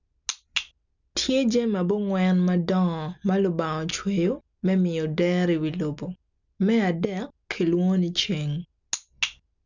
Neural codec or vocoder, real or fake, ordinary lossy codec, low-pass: none; real; none; 7.2 kHz